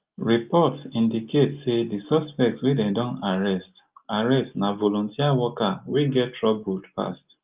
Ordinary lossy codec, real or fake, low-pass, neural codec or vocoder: Opus, 32 kbps; real; 3.6 kHz; none